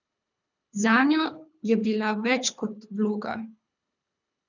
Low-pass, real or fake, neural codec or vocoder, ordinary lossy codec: 7.2 kHz; fake; codec, 24 kHz, 3 kbps, HILCodec; none